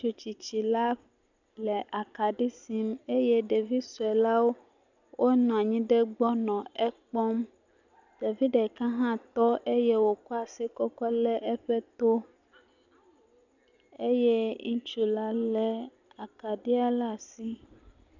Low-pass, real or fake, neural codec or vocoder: 7.2 kHz; real; none